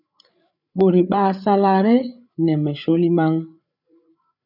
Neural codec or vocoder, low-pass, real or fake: codec, 16 kHz, 8 kbps, FreqCodec, larger model; 5.4 kHz; fake